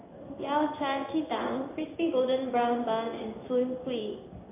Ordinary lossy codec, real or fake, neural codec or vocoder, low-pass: AAC, 24 kbps; fake; codec, 16 kHz in and 24 kHz out, 1 kbps, XY-Tokenizer; 3.6 kHz